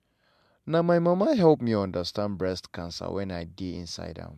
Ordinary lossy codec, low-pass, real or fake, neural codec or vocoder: MP3, 96 kbps; 14.4 kHz; real; none